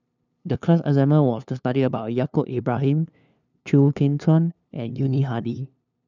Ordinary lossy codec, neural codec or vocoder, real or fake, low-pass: none; codec, 16 kHz, 2 kbps, FunCodec, trained on LibriTTS, 25 frames a second; fake; 7.2 kHz